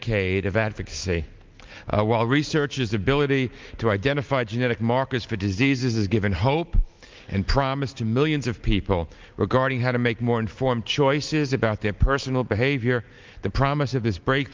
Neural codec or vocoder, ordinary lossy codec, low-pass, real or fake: none; Opus, 24 kbps; 7.2 kHz; real